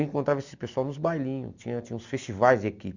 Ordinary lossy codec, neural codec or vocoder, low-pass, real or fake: none; none; 7.2 kHz; real